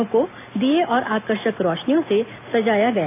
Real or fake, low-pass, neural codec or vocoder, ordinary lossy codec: real; 3.6 kHz; none; AAC, 24 kbps